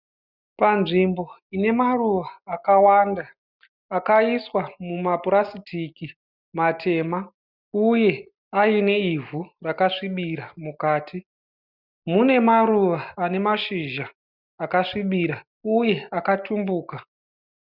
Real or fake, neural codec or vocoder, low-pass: real; none; 5.4 kHz